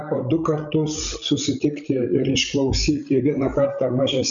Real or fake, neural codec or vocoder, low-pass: fake; codec, 16 kHz, 16 kbps, FreqCodec, larger model; 7.2 kHz